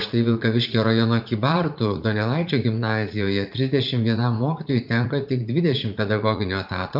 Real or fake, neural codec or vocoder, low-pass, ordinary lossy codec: fake; vocoder, 44.1 kHz, 80 mel bands, Vocos; 5.4 kHz; AAC, 48 kbps